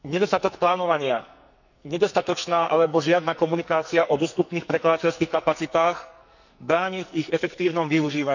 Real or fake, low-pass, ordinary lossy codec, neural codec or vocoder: fake; 7.2 kHz; none; codec, 44.1 kHz, 2.6 kbps, SNAC